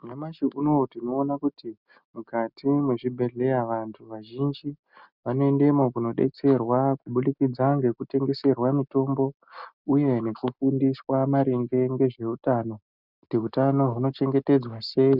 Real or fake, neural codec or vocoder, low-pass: real; none; 5.4 kHz